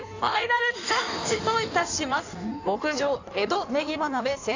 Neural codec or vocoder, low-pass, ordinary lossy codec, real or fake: codec, 16 kHz in and 24 kHz out, 1.1 kbps, FireRedTTS-2 codec; 7.2 kHz; AAC, 48 kbps; fake